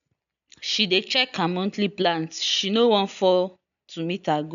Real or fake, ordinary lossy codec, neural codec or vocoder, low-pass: real; none; none; 7.2 kHz